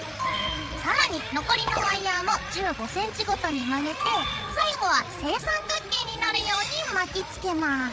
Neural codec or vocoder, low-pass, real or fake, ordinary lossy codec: codec, 16 kHz, 8 kbps, FreqCodec, larger model; none; fake; none